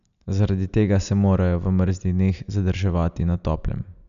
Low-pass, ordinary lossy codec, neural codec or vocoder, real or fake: 7.2 kHz; none; none; real